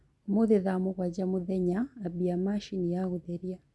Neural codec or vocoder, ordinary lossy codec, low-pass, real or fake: none; none; none; real